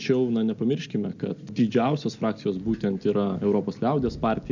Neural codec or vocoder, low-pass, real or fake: none; 7.2 kHz; real